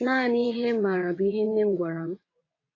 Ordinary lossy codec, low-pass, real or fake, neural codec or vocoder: AAC, 32 kbps; 7.2 kHz; fake; vocoder, 22.05 kHz, 80 mel bands, WaveNeXt